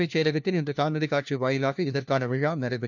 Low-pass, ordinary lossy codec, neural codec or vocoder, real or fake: 7.2 kHz; none; codec, 16 kHz, 1 kbps, FunCodec, trained on LibriTTS, 50 frames a second; fake